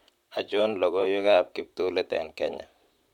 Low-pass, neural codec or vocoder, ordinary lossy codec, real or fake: 19.8 kHz; vocoder, 44.1 kHz, 128 mel bands, Pupu-Vocoder; none; fake